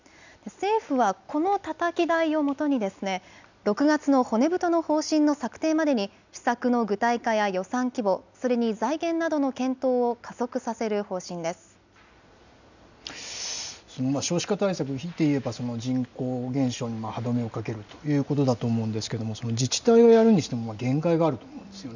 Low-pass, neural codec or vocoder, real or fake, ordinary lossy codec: 7.2 kHz; none; real; none